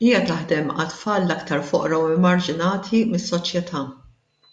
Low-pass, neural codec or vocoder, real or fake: 10.8 kHz; none; real